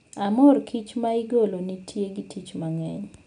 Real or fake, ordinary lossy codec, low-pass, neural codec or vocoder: real; none; 9.9 kHz; none